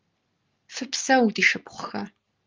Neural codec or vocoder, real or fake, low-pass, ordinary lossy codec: none; real; 7.2 kHz; Opus, 32 kbps